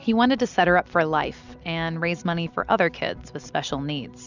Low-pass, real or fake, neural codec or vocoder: 7.2 kHz; real; none